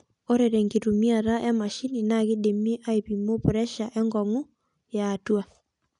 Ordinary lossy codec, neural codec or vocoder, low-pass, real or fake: none; none; 10.8 kHz; real